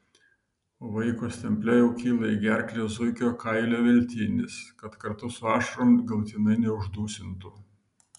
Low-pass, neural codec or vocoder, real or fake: 10.8 kHz; none; real